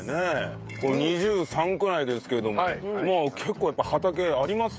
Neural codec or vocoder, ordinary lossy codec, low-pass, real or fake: codec, 16 kHz, 16 kbps, FreqCodec, smaller model; none; none; fake